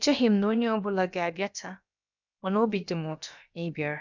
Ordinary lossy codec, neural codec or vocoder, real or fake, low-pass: none; codec, 16 kHz, about 1 kbps, DyCAST, with the encoder's durations; fake; 7.2 kHz